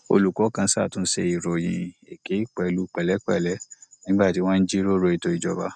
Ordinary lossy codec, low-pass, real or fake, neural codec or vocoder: none; 9.9 kHz; real; none